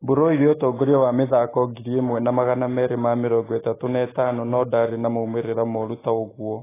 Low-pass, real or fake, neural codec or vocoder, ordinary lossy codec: 3.6 kHz; real; none; AAC, 16 kbps